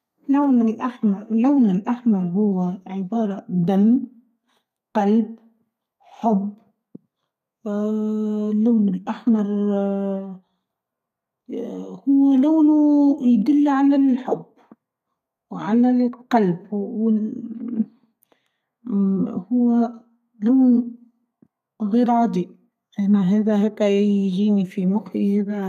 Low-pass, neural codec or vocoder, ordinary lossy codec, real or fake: 14.4 kHz; codec, 32 kHz, 1.9 kbps, SNAC; none; fake